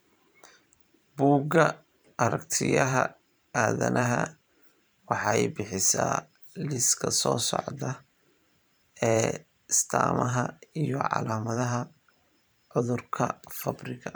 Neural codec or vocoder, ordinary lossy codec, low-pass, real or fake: vocoder, 44.1 kHz, 128 mel bands every 512 samples, BigVGAN v2; none; none; fake